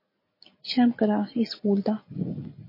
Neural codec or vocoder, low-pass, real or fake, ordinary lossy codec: none; 5.4 kHz; real; MP3, 24 kbps